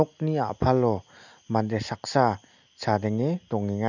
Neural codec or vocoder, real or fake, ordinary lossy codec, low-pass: none; real; none; 7.2 kHz